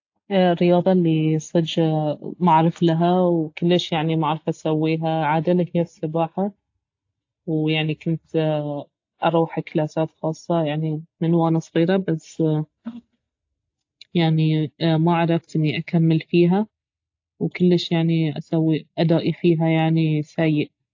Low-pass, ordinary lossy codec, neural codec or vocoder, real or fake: 7.2 kHz; AAC, 48 kbps; none; real